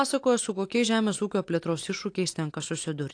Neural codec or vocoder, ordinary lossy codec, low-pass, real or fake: none; AAC, 48 kbps; 9.9 kHz; real